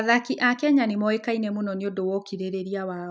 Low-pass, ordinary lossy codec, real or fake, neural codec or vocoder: none; none; real; none